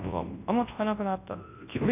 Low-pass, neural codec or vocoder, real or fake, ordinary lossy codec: 3.6 kHz; codec, 24 kHz, 0.9 kbps, WavTokenizer, large speech release; fake; AAC, 24 kbps